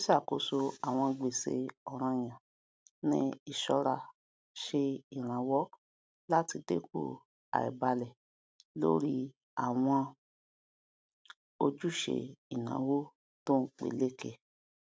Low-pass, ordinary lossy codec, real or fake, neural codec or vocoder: none; none; real; none